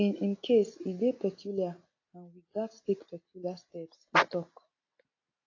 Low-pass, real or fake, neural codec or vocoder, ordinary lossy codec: 7.2 kHz; real; none; AAC, 32 kbps